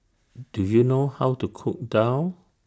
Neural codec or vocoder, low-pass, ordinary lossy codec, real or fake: none; none; none; real